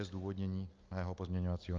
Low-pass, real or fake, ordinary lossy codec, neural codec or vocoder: 7.2 kHz; real; Opus, 24 kbps; none